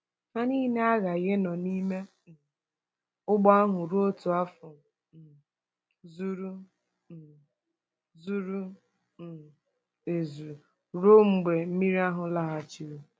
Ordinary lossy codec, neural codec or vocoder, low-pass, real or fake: none; none; none; real